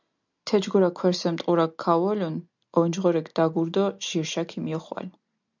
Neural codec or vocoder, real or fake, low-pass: none; real; 7.2 kHz